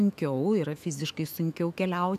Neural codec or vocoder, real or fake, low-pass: autoencoder, 48 kHz, 128 numbers a frame, DAC-VAE, trained on Japanese speech; fake; 14.4 kHz